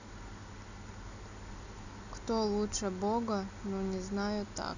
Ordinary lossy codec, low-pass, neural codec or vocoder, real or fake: none; 7.2 kHz; none; real